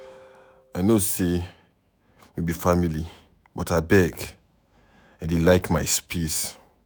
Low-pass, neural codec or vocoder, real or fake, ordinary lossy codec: none; autoencoder, 48 kHz, 128 numbers a frame, DAC-VAE, trained on Japanese speech; fake; none